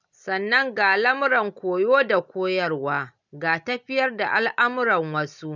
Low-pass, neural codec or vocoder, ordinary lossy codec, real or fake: 7.2 kHz; none; none; real